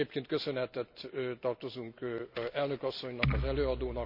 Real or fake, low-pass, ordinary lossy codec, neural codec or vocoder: real; 5.4 kHz; none; none